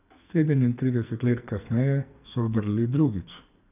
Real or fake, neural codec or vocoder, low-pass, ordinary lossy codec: fake; codec, 44.1 kHz, 2.6 kbps, SNAC; 3.6 kHz; none